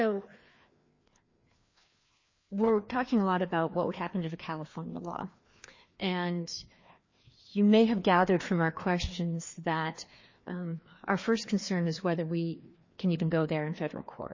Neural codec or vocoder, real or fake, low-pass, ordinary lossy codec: codec, 16 kHz, 2 kbps, FreqCodec, larger model; fake; 7.2 kHz; MP3, 32 kbps